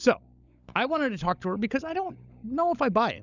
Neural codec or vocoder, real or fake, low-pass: codec, 16 kHz, 16 kbps, FunCodec, trained on LibriTTS, 50 frames a second; fake; 7.2 kHz